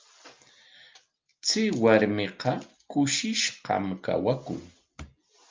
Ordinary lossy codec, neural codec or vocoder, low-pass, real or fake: Opus, 24 kbps; none; 7.2 kHz; real